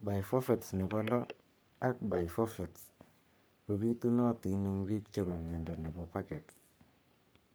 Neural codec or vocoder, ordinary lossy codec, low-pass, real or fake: codec, 44.1 kHz, 3.4 kbps, Pupu-Codec; none; none; fake